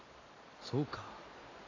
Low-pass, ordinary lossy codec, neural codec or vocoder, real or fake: 7.2 kHz; AAC, 32 kbps; none; real